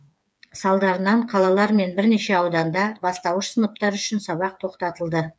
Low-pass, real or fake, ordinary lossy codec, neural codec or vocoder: none; fake; none; codec, 16 kHz, 8 kbps, FreqCodec, smaller model